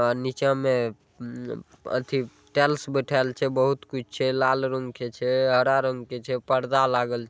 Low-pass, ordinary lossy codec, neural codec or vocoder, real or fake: none; none; none; real